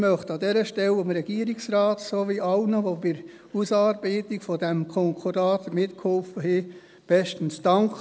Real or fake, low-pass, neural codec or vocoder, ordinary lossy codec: real; none; none; none